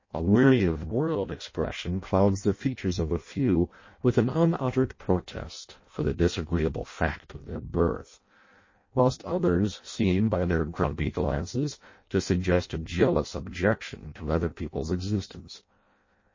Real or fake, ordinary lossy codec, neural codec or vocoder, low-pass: fake; MP3, 32 kbps; codec, 16 kHz in and 24 kHz out, 0.6 kbps, FireRedTTS-2 codec; 7.2 kHz